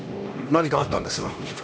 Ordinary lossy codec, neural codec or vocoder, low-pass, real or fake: none; codec, 16 kHz, 1 kbps, X-Codec, HuBERT features, trained on LibriSpeech; none; fake